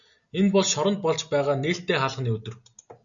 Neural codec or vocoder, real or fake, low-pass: none; real; 7.2 kHz